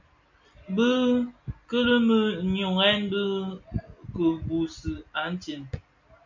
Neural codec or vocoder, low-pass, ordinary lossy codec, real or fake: none; 7.2 kHz; MP3, 64 kbps; real